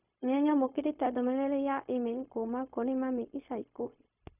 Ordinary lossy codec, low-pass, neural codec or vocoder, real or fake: none; 3.6 kHz; codec, 16 kHz, 0.4 kbps, LongCat-Audio-Codec; fake